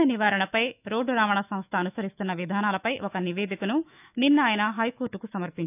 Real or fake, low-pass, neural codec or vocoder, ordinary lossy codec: fake; 3.6 kHz; autoencoder, 48 kHz, 128 numbers a frame, DAC-VAE, trained on Japanese speech; none